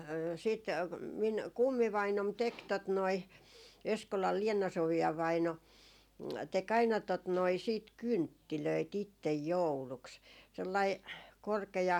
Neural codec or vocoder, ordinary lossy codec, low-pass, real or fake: none; none; 19.8 kHz; real